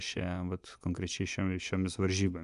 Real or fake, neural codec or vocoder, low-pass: fake; vocoder, 24 kHz, 100 mel bands, Vocos; 10.8 kHz